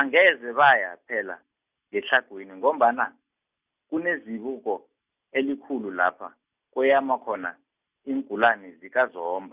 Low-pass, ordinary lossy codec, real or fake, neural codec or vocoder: 3.6 kHz; Opus, 64 kbps; real; none